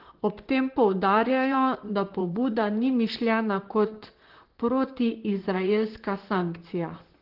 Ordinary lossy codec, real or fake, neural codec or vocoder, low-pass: Opus, 16 kbps; fake; vocoder, 44.1 kHz, 128 mel bands, Pupu-Vocoder; 5.4 kHz